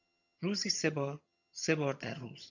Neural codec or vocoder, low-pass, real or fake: vocoder, 22.05 kHz, 80 mel bands, HiFi-GAN; 7.2 kHz; fake